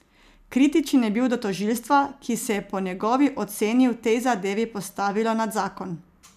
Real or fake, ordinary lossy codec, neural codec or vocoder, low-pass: real; none; none; 14.4 kHz